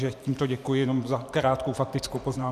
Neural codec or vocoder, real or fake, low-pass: vocoder, 44.1 kHz, 128 mel bands every 512 samples, BigVGAN v2; fake; 14.4 kHz